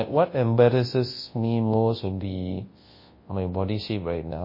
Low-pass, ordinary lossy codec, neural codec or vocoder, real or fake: 5.4 kHz; MP3, 24 kbps; codec, 24 kHz, 0.9 kbps, WavTokenizer, large speech release; fake